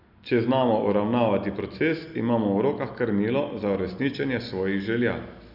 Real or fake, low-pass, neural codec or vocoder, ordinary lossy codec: real; 5.4 kHz; none; none